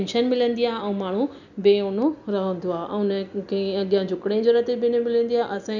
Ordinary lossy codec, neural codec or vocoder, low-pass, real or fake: none; none; 7.2 kHz; real